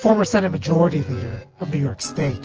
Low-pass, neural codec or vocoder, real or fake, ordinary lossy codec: 7.2 kHz; vocoder, 24 kHz, 100 mel bands, Vocos; fake; Opus, 16 kbps